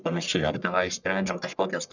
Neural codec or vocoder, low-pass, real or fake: codec, 44.1 kHz, 1.7 kbps, Pupu-Codec; 7.2 kHz; fake